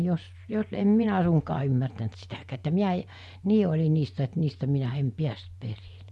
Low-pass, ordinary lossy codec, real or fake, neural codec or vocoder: none; none; fake; vocoder, 24 kHz, 100 mel bands, Vocos